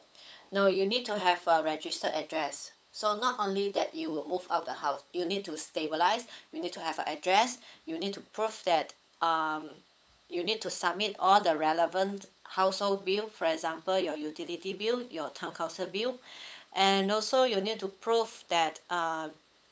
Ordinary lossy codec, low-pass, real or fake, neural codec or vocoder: none; none; fake; codec, 16 kHz, 8 kbps, FunCodec, trained on LibriTTS, 25 frames a second